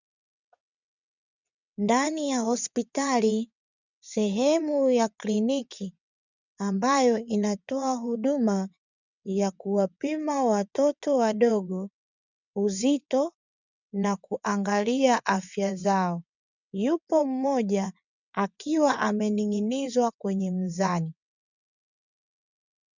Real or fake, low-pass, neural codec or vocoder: fake; 7.2 kHz; vocoder, 22.05 kHz, 80 mel bands, WaveNeXt